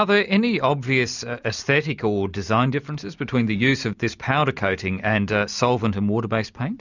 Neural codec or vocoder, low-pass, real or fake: none; 7.2 kHz; real